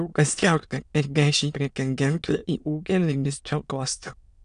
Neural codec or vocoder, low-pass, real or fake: autoencoder, 22.05 kHz, a latent of 192 numbers a frame, VITS, trained on many speakers; 9.9 kHz; fake